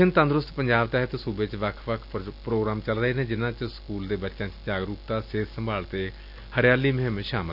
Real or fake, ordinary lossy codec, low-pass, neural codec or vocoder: real; none; 5.4 kHz; none